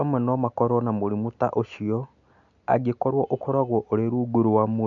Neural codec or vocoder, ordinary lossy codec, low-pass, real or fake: none; none; 7.2 kHz; real